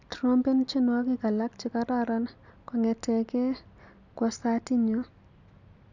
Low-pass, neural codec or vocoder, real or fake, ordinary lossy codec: 7.2 kHz; none; real; none